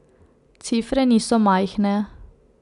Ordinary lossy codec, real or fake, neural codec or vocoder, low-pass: none; real; none; 10.8 kHz